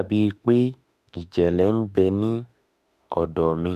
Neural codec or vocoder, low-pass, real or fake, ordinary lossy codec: autoencoder, 48 kHz, 32 numbers a frame, DAC-VAE, trained on Japanese speech; 14.4 kHz; fake; none